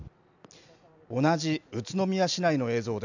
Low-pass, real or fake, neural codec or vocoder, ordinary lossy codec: 7.2 kHz; real; none; none